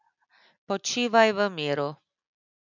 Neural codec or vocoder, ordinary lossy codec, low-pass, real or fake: none; none; 7.2 kHz; real